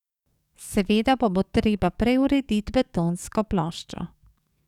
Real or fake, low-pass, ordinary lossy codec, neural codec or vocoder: fake; 19.8 kHz; none; codec, 44.1 kHz, 7.8 kbps, DAC